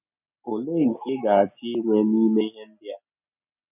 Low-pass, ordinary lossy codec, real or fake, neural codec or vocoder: 3.6 kHz; none; real; none